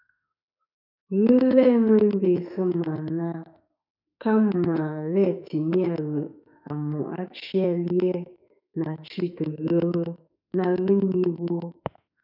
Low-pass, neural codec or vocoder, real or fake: 5.4 kHz; autoencoder, 48 kHz, 32 numbers a frame, DAC-VAE, trained on Japanese speech; fake